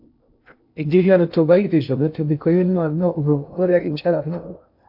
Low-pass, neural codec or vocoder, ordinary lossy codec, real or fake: 5.4 kHz; codec, 16 kHz in and 24 kHz out, 0.6 kbps, FocalCodec, streaming, 2048 codes; AAC, 48 kbps; fake